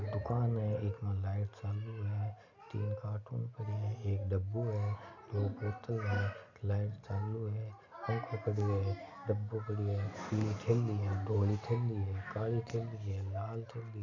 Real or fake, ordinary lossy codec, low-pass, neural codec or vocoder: real; none; 7.2 kHz; none